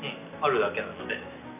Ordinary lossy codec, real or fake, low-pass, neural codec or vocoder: none; real; 3.6 kHz; none